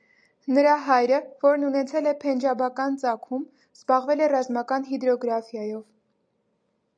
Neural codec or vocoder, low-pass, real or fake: none; 9.9 kHz; real